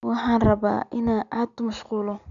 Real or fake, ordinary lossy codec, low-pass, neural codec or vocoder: real; none; 7.2 kHz; none